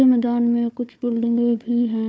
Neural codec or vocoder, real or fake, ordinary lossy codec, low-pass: codec, 16 kHz, 4 kbps, FunCodec, trained on Chinese and English, 50 frames a second; fake; none; none